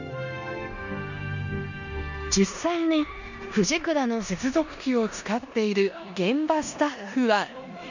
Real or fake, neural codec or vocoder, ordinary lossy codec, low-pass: fake; codec, 16 kHz in and 24 kHz out, 0.9 kbps, LongCat-Audio-Codec, four codebook decoder; none; 7.2 kHz